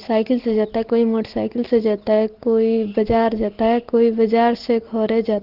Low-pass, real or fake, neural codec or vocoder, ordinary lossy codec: 5.4 kHz; real; none; Opus, 16 kbps